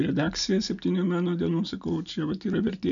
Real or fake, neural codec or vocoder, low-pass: real; none; 7.2 kHz